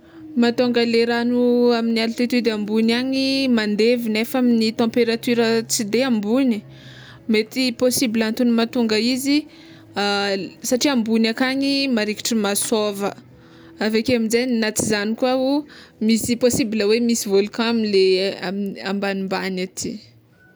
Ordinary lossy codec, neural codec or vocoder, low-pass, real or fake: none; none; none; real